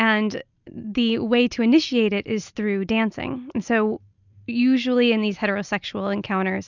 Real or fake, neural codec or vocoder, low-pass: real; none; 7.2 kHz